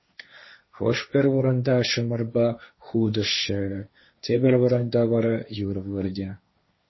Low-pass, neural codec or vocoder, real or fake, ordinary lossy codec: 7.2 kHz; codec, 16 kHz, 1.1 kbps, Voila-Tokenizer; fake; MP3, 24 kbps